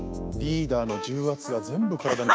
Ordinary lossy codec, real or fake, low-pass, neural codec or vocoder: none; fake; none; codec, 16 kHz, 6 kbps, DAC